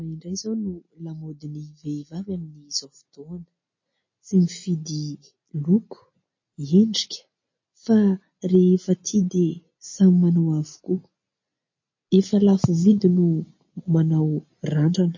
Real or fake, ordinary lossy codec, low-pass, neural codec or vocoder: real; MP3, 32 kbps; 7.2 kHz; none